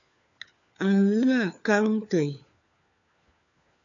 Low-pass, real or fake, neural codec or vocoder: 7.2 kHz; fake; codec, 16 kHz, 4 kbps, FunCodec, trained on LibriTTS, 50 frames a second